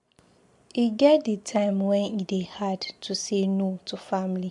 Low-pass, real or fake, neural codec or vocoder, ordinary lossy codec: 10.8 kHz; real; none; MP3, 64 kbps